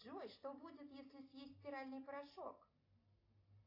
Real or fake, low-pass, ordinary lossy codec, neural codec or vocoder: real; 5.4 kHz; AAC, 24 kbps; none